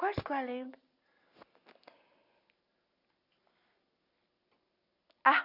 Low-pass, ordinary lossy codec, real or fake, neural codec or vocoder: 5.4 kHz; none; real; none